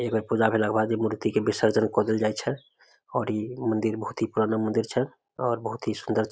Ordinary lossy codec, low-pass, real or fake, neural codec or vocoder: none; none; real; none